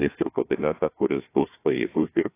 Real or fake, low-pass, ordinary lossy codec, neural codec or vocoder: fake; 3.6 kHz; AAC, 24 kbps; codec, 16 kHz, 1 kbps, FunCodec, trained on Chinese and English, 50 frames a second